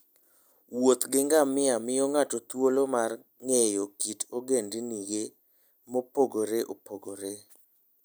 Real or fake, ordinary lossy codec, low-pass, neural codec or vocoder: real; none; none; none